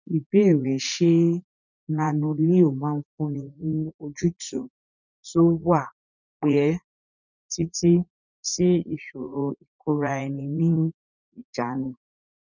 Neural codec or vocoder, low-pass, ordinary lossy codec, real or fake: vocoder, 44.1 kHz, 80 mel bands, Vocos; 7.2 kHz; none; fake